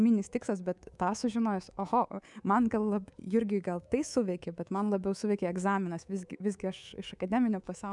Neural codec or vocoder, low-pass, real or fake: codec, 24 kHz, 3.1 kbps, DualCodec; 10.8 kHz; fake